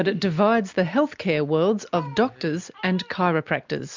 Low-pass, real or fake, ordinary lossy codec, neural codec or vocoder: 7.2 kHz; real; MP3, 64 kbps; none